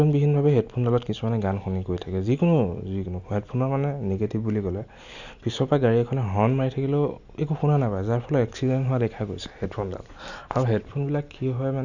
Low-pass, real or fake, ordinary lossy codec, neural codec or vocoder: 7.2 kHz; real; none; none